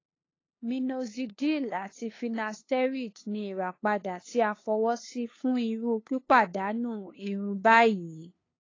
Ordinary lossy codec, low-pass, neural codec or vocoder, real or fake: AAC, 32 kbps; 7.2 kHz; codec, 16 kHz, 2 kbps, FunCodec, trained on LibriTTS, 25 frames a second; fake